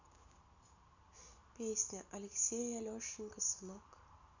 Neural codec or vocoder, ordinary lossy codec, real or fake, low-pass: none; none; real; 7.2 kHz